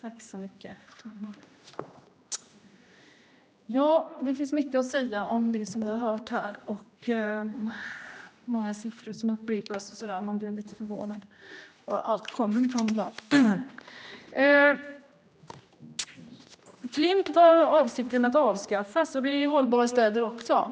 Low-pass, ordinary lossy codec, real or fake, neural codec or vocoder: none; none; fake; codec, 16 kHz, 1 kbps, X-Codec, HuBERT features, trained on general audio